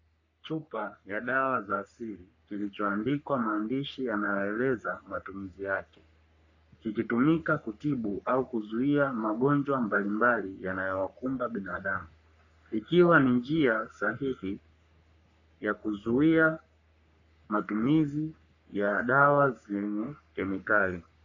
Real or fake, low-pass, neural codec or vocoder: fake; 7.2 kHz; codec, 44.1 kHz, 3.4 kbps, Pupu-Codec